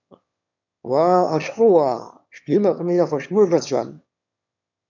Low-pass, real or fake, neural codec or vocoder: 7.2 kHz; fake; autoencoder, 22.05 kHz, a latent of 192 numbers a frame, VITS, trained on one speaker